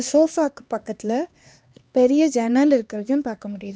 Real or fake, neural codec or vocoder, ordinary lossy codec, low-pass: fake; codec, 16 kHz, 2 kbps, X-Codec, HuBERT features, trained on LibriSpeech; none; none